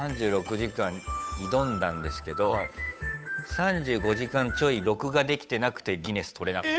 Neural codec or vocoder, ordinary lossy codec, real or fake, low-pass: codec, 16 kHz, 8 kbps, FunCodec, trained on Chinese and English, 25 frames a second; none; fake; none